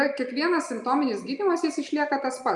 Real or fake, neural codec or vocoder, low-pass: real; none; 10.8 kHz